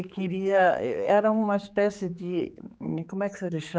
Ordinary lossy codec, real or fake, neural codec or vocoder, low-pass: none; fake; codec, 16 kHz, 4 kbps, X-Codec, HuBERT features, trained on general audio; none